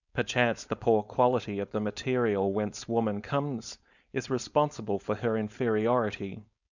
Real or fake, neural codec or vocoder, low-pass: fake; codec, 16 kHz, 4.8 kbps, FACodec; 7.2 kHz